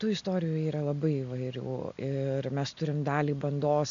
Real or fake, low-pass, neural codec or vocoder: real; 7.2 kHz; none